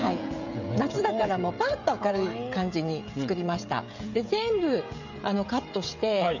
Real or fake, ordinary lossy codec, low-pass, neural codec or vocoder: fake; none; 7.2 kHz; codec, 16 kHz, 16 kbps, FreqCodec, smaller model